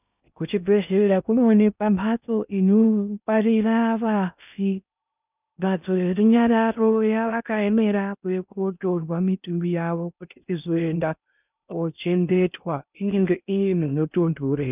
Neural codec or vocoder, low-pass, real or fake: codec, 16 kHz in and 24 kHz out, 0.6 kbps, FocalCodec, streaming, 2048 codes; 3.6 kHz; fake